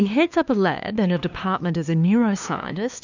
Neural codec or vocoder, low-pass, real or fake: codec, 16 kHz, 2 kbps, FunCodec, trained on LibriTTS, 25 frames a second; 7.2 kHz; fake